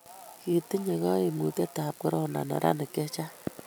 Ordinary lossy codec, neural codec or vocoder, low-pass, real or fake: none; none; none; real